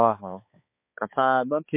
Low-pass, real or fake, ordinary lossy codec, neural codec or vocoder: 3.6 kHz; fake; MP3, 24 kbps; codec, 16 kHz, 2 kbps, X-Codec, HuBERT features, trained on balanced general audio